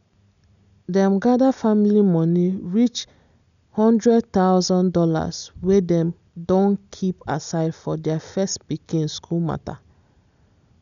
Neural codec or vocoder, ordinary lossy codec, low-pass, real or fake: none; none; 7.2 kHz; real